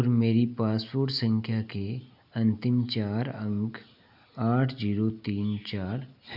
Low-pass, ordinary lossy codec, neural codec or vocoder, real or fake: 5.4 kHz; none; none; real